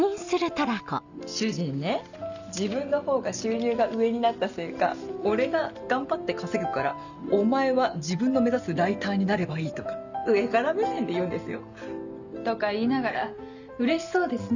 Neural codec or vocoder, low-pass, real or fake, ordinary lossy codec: none; 7.2 kHz; real; none